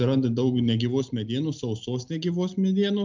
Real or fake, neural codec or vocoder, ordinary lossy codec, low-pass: real; none; MP3, 64 kbps; 7.2 kHz